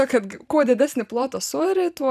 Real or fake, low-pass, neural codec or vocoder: real; 14.4 kHz; none